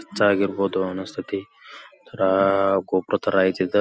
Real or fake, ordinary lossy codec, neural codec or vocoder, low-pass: real; none; none; none